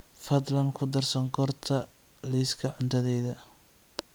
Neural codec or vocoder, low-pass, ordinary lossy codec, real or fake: vocoder, 44.1 kHz, 128 mel bands every 512 samples, BigVGAN v2; none; none; fake